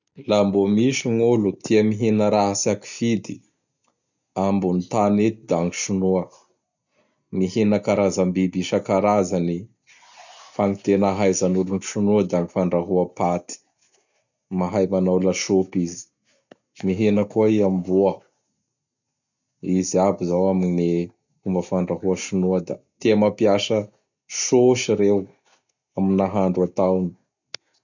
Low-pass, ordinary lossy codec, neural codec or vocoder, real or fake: 7.2 kHz; none; none; real